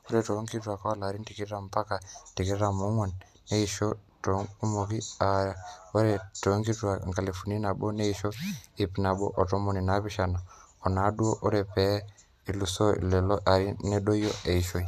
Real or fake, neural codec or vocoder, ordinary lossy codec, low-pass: real; none; none; 14.4 kHz